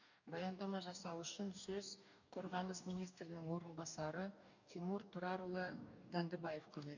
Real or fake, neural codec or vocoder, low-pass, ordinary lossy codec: fake; codec, 44.1 kHz, 2.6 kbps, DAC; 7.2 kHz; none